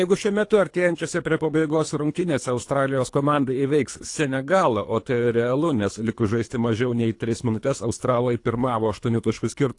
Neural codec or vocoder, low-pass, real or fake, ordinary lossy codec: codec, 24 kHz, 3 kbps, HILCodec; 10.8 kHz; fake; AAC, 48 kbps